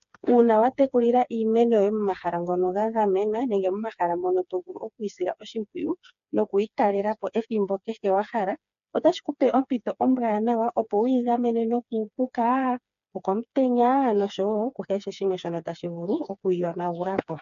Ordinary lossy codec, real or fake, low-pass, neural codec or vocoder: AAC, 96 kbps; fake; 7.2 kHz; codec, 16 kHz, 4 kbps, FreqCodec, smaller model